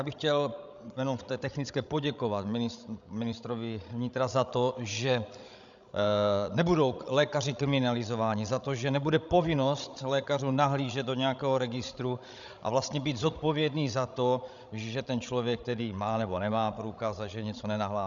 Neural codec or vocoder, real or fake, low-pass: codec, 16 kHz, 16 kbps, FreqCodec, larger model; fake; 7.2 kHz